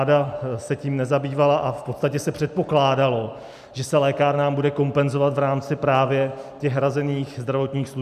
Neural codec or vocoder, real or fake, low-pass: none; real; 14.4 kHz